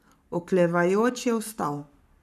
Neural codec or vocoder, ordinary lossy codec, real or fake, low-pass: codec, 44.1 kHz, 7.8 kbps, Pupu-Codec; none; fake; 14.4 kHz